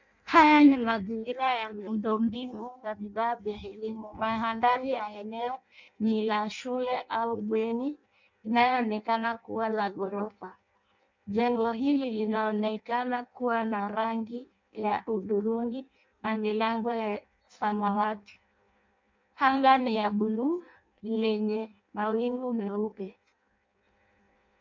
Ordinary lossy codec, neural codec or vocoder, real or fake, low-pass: MP3, 64 kbps; codec, 16 kHz in and 24 kHz out, 0.6 kbps, FireRedTTS-2 codec; fake; 7.2 kHz